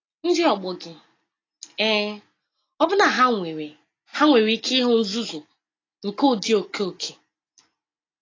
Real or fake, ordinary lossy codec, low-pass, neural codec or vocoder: real; AAC, 32 kbps; 7.2 kHz; none